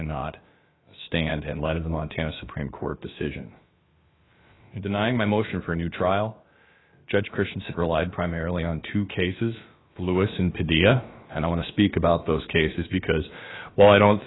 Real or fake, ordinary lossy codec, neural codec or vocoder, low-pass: fake; AAC, 16 kbps; codec, 16 kHz, about 1 kbps, DyCAST, with the encoder's durations; 7.2 kHz